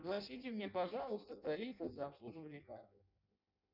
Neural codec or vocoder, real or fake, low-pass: codec, 16 kHz in and 24 kHz out, 0.6 kbps, FireRedTTS-2 codec; fake; 5.4 kHz